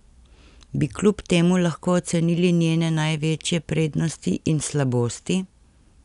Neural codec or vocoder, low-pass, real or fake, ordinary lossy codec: none; 10.8 kHz; real; none